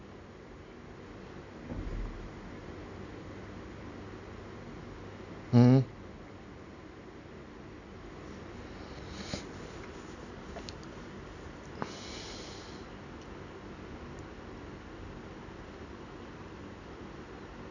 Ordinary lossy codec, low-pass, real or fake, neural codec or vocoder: none; 7.2 kHz; real; none